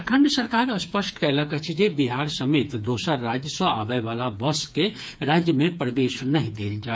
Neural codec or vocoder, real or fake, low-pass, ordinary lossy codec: codec, 16 kHz, 4 kbps, FreqCodec, smaller model; fake; none; none